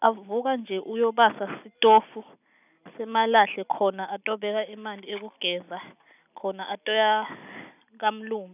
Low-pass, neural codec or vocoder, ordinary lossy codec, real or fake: 3.6 kHz; none; none; real